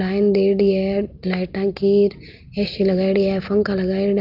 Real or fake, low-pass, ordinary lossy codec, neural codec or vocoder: real; 5.4 kHz; Opus, 32 kbps; none